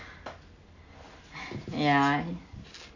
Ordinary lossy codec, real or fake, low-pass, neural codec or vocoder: none; real; 7.2 kHz; none